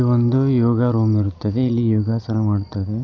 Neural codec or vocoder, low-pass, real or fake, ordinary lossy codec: none; 7.2 kHz; real; none